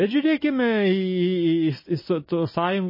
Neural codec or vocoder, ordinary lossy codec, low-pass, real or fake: none; MP3, 24 kbps; 5.4 kHz; real